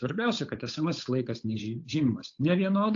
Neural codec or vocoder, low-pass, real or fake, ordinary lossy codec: codec, 16 kHz, 8 kbps, FunCodec, trained on Chinese and English, 25 frames a second; 7.2 kHz; fake; AAC, 48 kbps